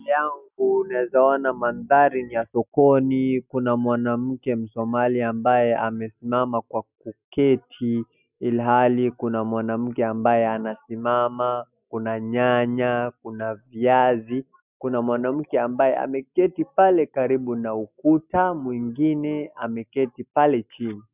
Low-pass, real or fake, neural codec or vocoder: 3.6 kHz; real; none